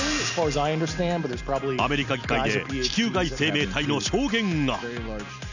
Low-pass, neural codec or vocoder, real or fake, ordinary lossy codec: 7.2 kHz; none; real; none